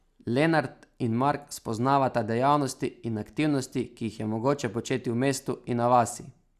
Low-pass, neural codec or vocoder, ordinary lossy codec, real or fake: 14.4 kHz; none; Opus, 64 kbps; real